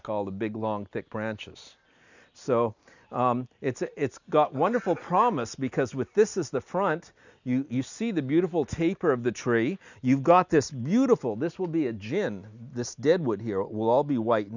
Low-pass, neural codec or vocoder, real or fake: 7.2 kHz; none; real